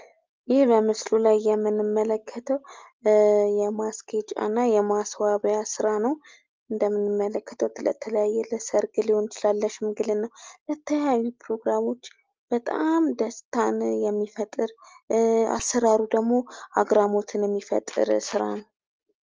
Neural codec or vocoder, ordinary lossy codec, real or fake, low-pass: none; Opus, 24 kbps; real; 7.2 kHz